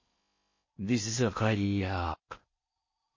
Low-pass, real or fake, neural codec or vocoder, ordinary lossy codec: 7.2 kHz; fake; codec, 16 kHz in and 24 kHz out, 0.6 kbps, FocalCodec, streaming, 4096 codes; MP3, 32 kbps